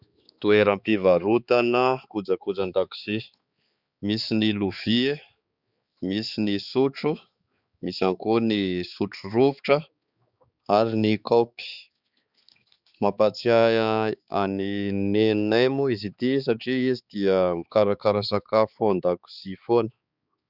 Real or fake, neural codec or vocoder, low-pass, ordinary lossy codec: fake; codec, 16 kHz, 4 kbps, X-Codec, HuBERT features, trained on balanced general audio; 5.4 kHz; Opus, 64 kbps